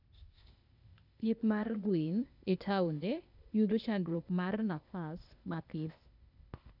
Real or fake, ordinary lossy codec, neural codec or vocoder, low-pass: fake; none; codec, 16 kHz, 0.8 kbps, ZipCodec; 5.4 kHz